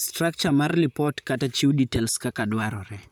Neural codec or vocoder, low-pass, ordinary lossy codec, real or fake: vocoder, 44.1 kHz, 128 mel bands, Pupu-Vocoder; none; none; fake